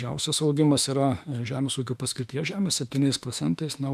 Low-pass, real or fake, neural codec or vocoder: 14.4 kHz; fake; autoencoder, 48 kHz, 32 numbers a frame, DAC-VAE, trained on Japanese speech